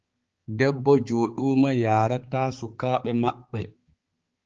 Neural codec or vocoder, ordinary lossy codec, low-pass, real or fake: codec, 16 kHz, 4 kbps, X-Codec, HuBERT features, trained on balanced general audio; Opus, 16 kbps; 7.2 kHz; fake